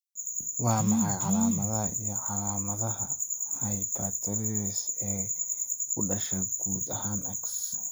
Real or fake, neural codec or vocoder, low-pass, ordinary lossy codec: real; none; none; none